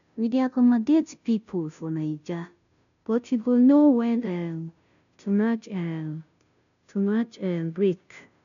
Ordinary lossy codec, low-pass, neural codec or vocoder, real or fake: none; 7.2 kHz; codec, 16 kHz, 0.5 kbps, FunCodec, trained on Chinese and English, 25 frames a second; fake